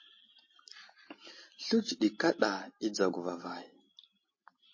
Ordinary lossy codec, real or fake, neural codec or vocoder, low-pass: MP3, 32 kbps; real; none; 7.2 kHz